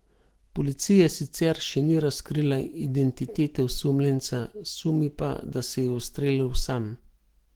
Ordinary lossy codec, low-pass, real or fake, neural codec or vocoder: Opus, 16 kbps; 19.8 kHz; fake; autoencoder, 48 kHz, 128 numbers a frame, DAC-VAE, trained on Japanese speech